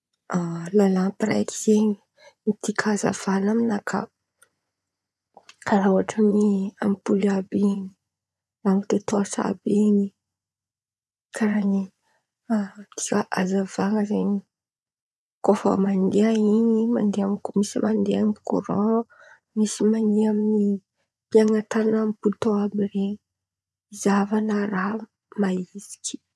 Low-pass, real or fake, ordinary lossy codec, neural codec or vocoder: none; fake; none; vocoder, 24 kHz, 100 mel bands, Vocos